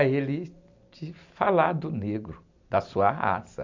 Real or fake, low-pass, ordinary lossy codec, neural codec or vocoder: real; 7.2 kHz; MP3, 64 kbps; none